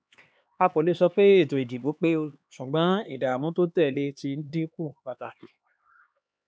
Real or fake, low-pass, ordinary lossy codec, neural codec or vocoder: fake; none; none; codec, 16 kHz, 2 kbps, X-Codec, HuBERT features, trained on LibriSpeech